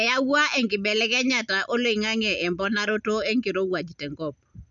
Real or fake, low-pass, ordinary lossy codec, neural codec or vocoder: real; 7.2 kHz; none; none